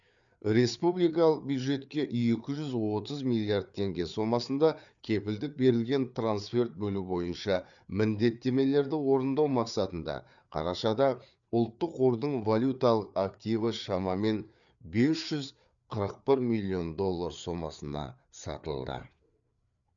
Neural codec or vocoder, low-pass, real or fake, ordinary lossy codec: codec, 16 kHz, 4 kbps, FreqCodec, larger model; 7.2 kHz; fake; none